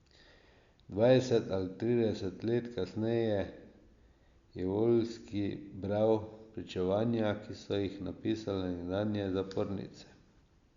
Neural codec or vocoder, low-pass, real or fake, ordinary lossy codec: none; 7.2 kHz; real; Opus, 64 kbps